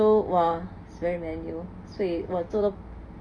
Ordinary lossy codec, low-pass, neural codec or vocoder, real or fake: none; 9.9 kHz; none; real